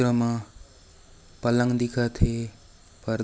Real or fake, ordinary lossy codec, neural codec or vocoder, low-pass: real; none; none; none